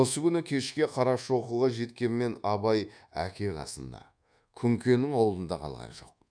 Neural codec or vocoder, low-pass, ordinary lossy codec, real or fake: codec, 24 kHz, 1.2 kbps, DualCodec; 9.9 kHz; none; fake